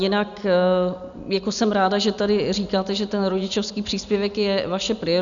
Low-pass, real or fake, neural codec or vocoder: 7.2 kHz; real; none